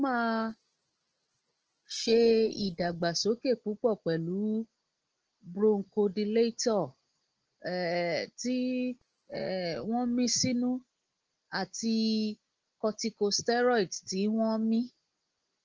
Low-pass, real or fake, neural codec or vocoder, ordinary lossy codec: 7.2 kHz; real; none; Opus, 16 kbps